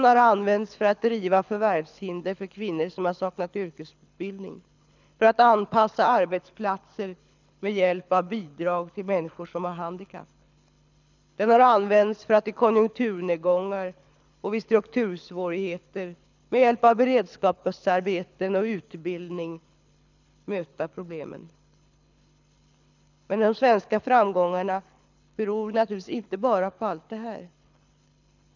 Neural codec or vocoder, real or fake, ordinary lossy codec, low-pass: codec, 24 kHz, 6 kbps, HILCodec; fake; none; 7.2 kHz